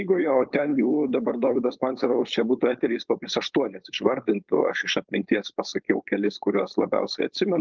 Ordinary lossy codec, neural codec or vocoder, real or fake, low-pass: Opus, 32 kbps; codec, 16 kHz, 4.8 kbps, FACodec; fake; 7.2 kHz